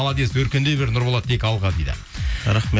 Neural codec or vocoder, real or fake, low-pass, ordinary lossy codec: none; real; none; none